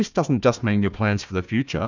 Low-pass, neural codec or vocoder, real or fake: 7.2 kHz; codec, 16 kHz, 1 kbps, FunCodec, trained on Chinese and English, 50 frames a second; fake